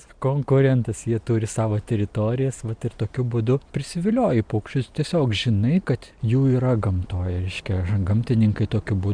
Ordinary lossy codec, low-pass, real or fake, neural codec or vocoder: MP3, 96 kbps; 9.9 kHz; real; none